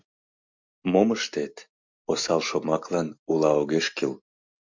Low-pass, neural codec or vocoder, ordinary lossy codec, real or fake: 7.2 kHz; none; MP3, 64 kbps; real